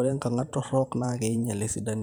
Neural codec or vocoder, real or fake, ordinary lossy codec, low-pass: vocoder, 44.1 kHz, 128 mel bands every 512 samples, BigVGAN v2; fake; none; none